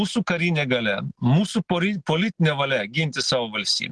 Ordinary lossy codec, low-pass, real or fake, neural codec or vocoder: Opus, 16 kbps; 10.8 kHz; real; none